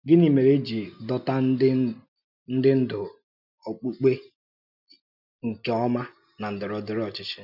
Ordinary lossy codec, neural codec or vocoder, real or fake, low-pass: none; none; real; 5.4 kHz